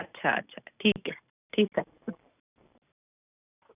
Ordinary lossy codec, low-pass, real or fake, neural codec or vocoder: none; 3.6 kHz; real; none